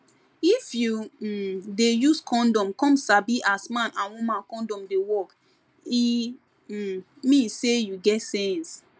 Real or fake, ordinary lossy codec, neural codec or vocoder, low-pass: real; none; none; none